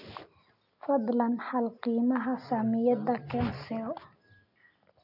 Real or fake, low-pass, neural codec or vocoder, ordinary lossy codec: real; 5.4 kHz; none; none